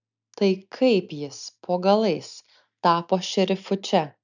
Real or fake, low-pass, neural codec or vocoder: real; 7.2 kHz; none